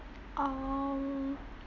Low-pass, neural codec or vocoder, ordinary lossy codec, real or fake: 7.2 kHz; none; none; real